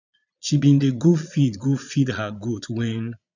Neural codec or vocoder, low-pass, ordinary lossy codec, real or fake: vocoder, 22.05 kHz, 80 mel bands, Vocos; 7.2 kHz; none; fake